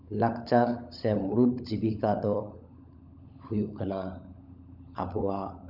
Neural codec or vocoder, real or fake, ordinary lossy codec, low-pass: codec, 16 kHz, 16 kbps, FunCodec, trained on LibriTTS, 50 frames a second; fake; none; 5.4 kHz